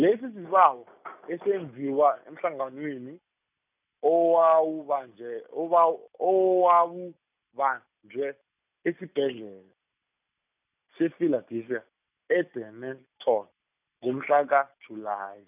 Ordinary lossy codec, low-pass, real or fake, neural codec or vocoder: none; 3.6 kHz; real; none